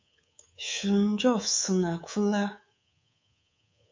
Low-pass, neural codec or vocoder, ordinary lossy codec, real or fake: 7.2 kHz; codec, 24 kHz, 3.1 kbps, DualCodec; MP3, 48 kbps; fake